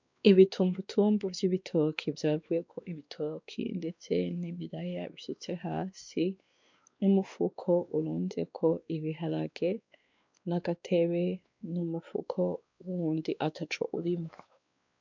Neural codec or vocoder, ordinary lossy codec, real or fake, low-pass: codec, 16 kHz, 2 kbps, X-Codec, WavLM features, trained on Multilingual LibriSpeech; MP3, 64 kbps; fake; 7.2 kHz